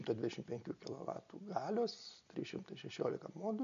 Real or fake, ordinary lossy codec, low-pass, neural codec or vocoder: real; AAC, 64 kbps; 7.2 kHz; none